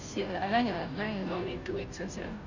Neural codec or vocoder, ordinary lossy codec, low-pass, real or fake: codec, 16 kHz, 0.5 kbps, FunCodec, trained on Chinese and English, 25 frames a second; none; 7.2 kHz; fake